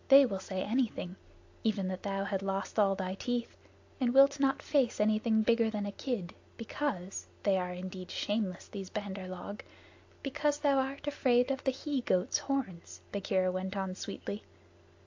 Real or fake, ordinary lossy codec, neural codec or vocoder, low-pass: real; AAC, 48 kbps; none; 7.2 kHz